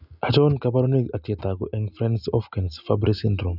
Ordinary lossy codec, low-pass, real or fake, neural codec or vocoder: none; 5.4 kHz; real; none